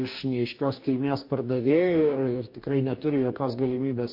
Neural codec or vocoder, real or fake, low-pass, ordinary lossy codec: codec, 44.1 kHz, 2.6 kbps, DAC; fake; 5.4 kHz; MP3, 32 kbps